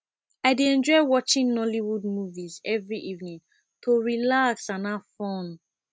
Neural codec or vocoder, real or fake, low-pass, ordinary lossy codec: none; real; none; none